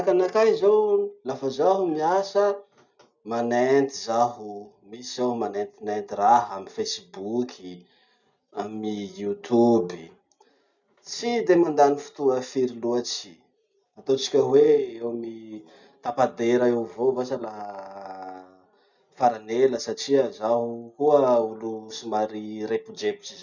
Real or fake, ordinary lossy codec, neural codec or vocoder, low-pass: real; none; none; 7.2 kHz